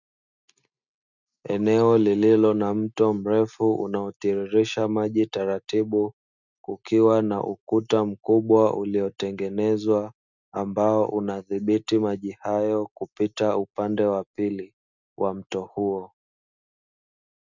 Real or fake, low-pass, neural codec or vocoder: real; 7.2 kHz; none